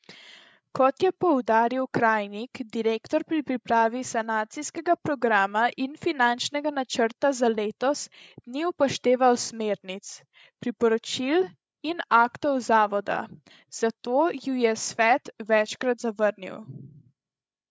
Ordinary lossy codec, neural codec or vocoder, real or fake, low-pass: none; codec, 16 kHz, 16 kbps, FreqCodec, larger model; fake; none